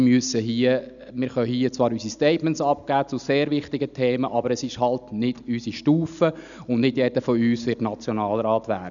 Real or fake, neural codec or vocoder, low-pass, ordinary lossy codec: real; none; 7.2 kHz; none